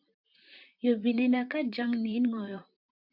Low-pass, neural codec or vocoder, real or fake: 5.4 kHz; vocoder, 44.1 kHz, 128 mel bands, Pupu-Vocoder; fake